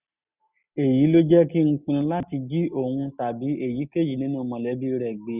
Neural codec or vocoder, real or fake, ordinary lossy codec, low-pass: none; real; none; 3.6 kHz